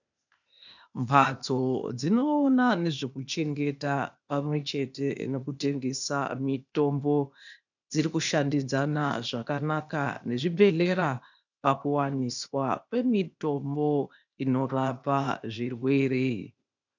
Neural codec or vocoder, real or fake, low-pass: codec, 16 kHz, 0.8 kbps, ZipCodec; fake; 7.2 kHz